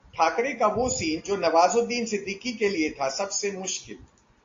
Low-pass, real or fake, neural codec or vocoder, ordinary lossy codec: 7.2 kHz; real; none; AAC, 48 kbps